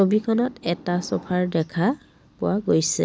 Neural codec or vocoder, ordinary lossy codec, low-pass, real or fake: none; none; none; real